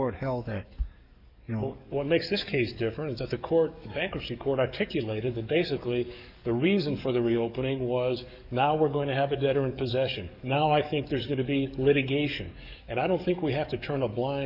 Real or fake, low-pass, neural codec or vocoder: fake; 5.4 kHz; codec, 44.1 kHz, 7.8 kbps, DAC